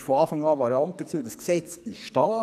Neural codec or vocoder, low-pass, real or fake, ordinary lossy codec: codec, 44.1 kHz, 2.6 kbps, SNAC; 14.4 kHz; fake; none